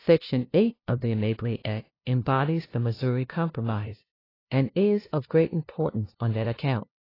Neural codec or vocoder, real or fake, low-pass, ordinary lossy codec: codec, 16 kHz, 0.5 kbps, FunCodec, trained on LibriTTS, 25 frames a second; fake; 5.4 kHz; AAC, 24 kbps